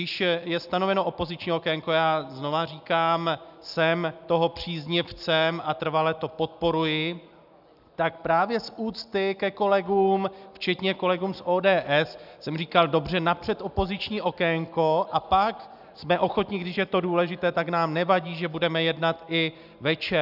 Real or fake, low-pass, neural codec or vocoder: real; 5.4 kHz; none